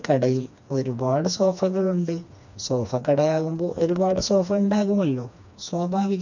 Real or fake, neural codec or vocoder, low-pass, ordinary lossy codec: fake; codec, 16 kHz, 2 kbps, FreqCodec, smaller model; 7.2 kHz; none